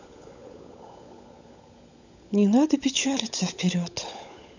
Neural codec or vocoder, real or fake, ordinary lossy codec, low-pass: codec, 16 kHz, 16 kbps, FunCodec, trained on LibriTTS, 50 frames a second; fake; none; 7.2 kHz